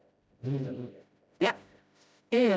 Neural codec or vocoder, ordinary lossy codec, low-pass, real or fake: codec, 16 kHz, 0.5 kbps, FreqCodec, smaller model; none; none; fake